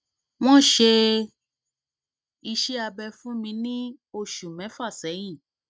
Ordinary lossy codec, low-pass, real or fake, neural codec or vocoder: none; none; real; none